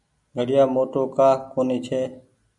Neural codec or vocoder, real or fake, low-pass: none; real; 10.8 kHz